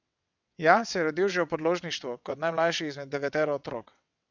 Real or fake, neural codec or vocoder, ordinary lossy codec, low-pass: fake; vocoder, 22.05 kHz, 80 mel bands, WaveNeXt; none; 7.2 kHz